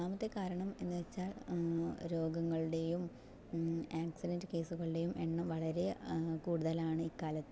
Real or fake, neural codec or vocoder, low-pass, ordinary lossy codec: real; none; none; none